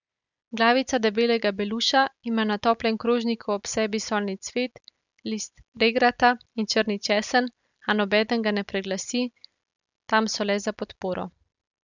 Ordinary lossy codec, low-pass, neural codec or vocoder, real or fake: none; 7.2 kHz; none; real